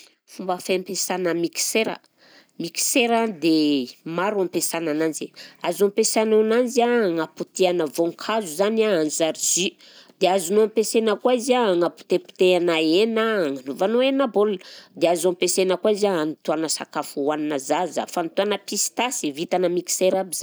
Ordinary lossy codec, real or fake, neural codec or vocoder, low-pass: none; real; none; none